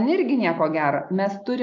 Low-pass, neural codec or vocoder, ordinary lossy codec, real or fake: 7.2 kHz; none; AAC, 48 kbps; real